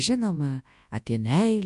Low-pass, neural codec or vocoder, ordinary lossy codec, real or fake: 10.8 kHz; codec, 24 kHz, 0.9 kbps, WavTokenizer, large speech release; MP3, 64 kbps; fake